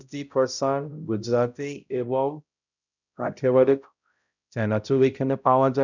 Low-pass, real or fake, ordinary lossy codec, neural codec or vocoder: 7.2 kHz; fake; none; codec, 16 kHz, 0.5 kbps, X-Codec, HuBERT features, trained on balanced general audio